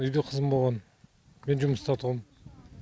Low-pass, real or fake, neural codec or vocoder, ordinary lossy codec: none; real; none; none